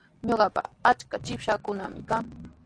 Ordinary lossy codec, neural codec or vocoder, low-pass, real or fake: AAC, 48 kbps; none; 9.9 kHz; real